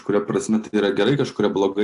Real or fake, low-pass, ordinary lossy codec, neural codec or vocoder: real; 10.8 kHz; AAC, 64 kbps; none